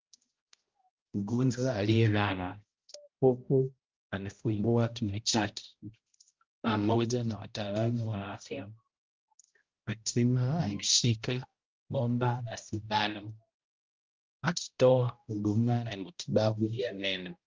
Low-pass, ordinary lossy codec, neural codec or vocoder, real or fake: 7.2 kHz; Opus, 32 kbps; codec, 16 kHz, 0.5 kbps, X-Codec, HuBERT features, trained on balanced general audio; fake